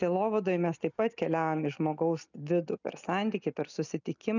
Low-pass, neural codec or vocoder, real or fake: 7.2 kHz; none; real